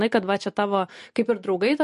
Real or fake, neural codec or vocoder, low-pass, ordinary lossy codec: real; none; 10.8 kHz; MP3, 48 kbps